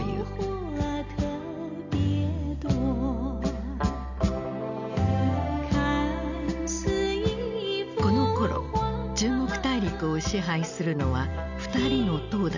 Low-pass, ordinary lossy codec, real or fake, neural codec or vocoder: 7.2 kHz; none; real; none